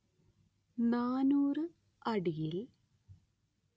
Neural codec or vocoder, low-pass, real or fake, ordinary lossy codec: none; none; real; none